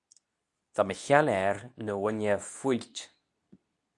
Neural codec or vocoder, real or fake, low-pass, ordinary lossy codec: codec, 24 kHz, 0.9 kbps, WavTokenizer, medium speech release version 2; fake; 10.8 kHz; MP3, 96 kbps